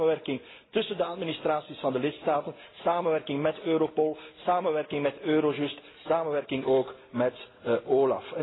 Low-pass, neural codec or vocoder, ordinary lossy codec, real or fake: 7.2 kHz; none; AAC, 16 kbps; real